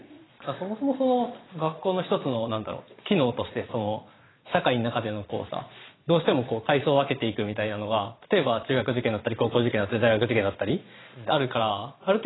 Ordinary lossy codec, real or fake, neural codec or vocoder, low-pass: AAC, 16 kbps; fake; vocoder, 22.05 kHz, 80 mel bands, Vocos; 7.2 kHz